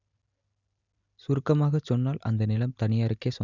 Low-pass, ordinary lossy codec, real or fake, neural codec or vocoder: 7.2 kHz; none; real; none